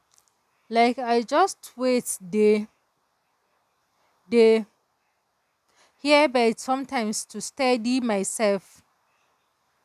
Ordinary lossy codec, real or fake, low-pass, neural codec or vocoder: none; real; 14.4 kHz; none